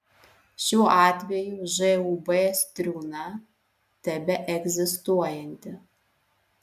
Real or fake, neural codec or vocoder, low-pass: real; none; 14.4 kHz